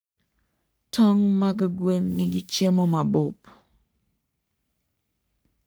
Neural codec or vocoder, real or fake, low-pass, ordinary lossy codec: codec, 44.1 kHz, 3.4 kbps, Pupu-Codec; fake; none; none